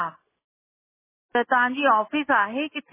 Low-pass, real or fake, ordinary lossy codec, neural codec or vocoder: 3.6 kHz; real; MP3, 16 kbps; none